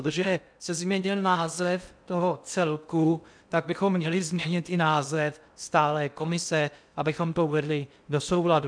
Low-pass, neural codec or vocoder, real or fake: 9.9 kHz; codec, 16 kHz in and 24 kHz out, 0.6 kbps, FocalCodec, streaming, 2048 codes; fake